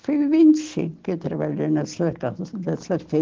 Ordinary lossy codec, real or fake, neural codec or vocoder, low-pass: Opus, 16 kbps; real; none; 7.2 kHz